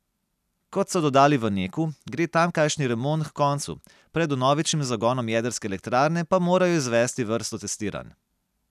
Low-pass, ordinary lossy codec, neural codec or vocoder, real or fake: 14.4 kHz; none; none; real